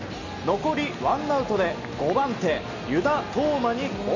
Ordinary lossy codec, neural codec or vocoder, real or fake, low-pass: none; none; real; 7.2 kHz